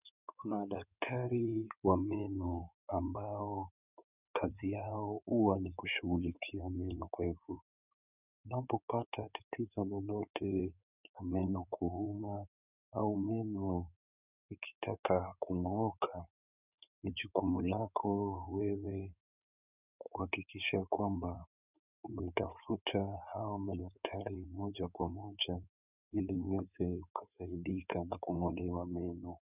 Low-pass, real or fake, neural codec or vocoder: 3.6 kHz; fake; codec, 16 kHz in and 24 kHz out, 2.2 kbps, FireRedTTS-2 codec